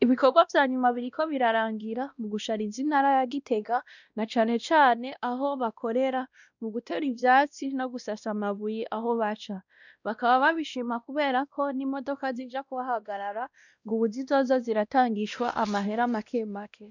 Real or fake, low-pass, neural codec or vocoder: fake; 7.2 kHz; codec, 16 kHz, 1 kbps, X-Codec, WavLM features, trained on Multilingual LibriSpeech